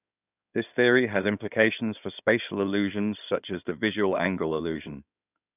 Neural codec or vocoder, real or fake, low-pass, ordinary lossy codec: codec, 16 kHz in and 24 kHz out, 2.2 kbps, FireRedTTS-2 codec; fake; 3.6 kHz; none